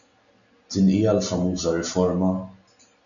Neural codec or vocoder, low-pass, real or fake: none; 7.2 kHz; real